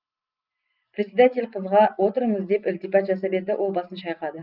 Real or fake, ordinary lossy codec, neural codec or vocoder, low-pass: real; Opus, 32 kbps; none; 5.4 kHz